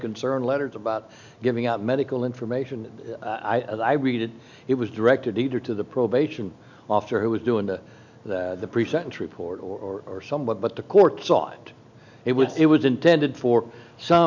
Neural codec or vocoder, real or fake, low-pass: none; real; 7.2 kHz